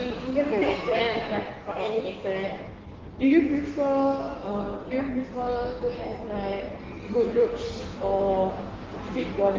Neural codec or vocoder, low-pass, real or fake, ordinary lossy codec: codec, 16 kHz in and 24 kHz out, 1.1 kbps, FireRedTTS-2 codec; 7.2 kHz; fake; Opus, 16 kbps